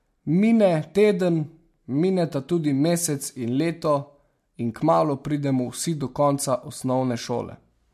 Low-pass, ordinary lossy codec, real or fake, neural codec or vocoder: 14.4 kHz; MP3, 64 kbps; real; none